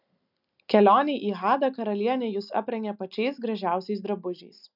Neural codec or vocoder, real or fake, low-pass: none; real; 5.4 kHz